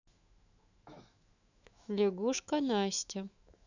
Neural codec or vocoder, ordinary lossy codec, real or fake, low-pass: autoencoder, 48 kHz, 128 numbers a frame, DAC-VAE, trained on Japanese speech; none; fake; 7.2 kHz